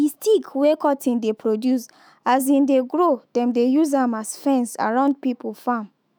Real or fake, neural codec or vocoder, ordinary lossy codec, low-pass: fake; autoencoder, 48 kHz, 128 numbers a frame, DAC-VAE, trained on Japanese speech; none; none